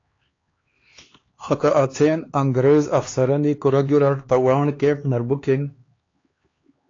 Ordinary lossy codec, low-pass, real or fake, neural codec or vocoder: AAC, 32 kbps; 7.2 kHz; fake; codec, 16 kHz, 2 kbps, X-Codec, HuBERT features, trained on LibriSpeech